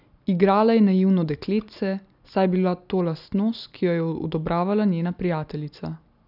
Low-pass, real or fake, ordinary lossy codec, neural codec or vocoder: 5.4 kHz; real; none; none